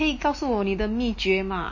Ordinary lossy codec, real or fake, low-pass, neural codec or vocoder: MP3, 32 kbps; real; 7.2 kHz; none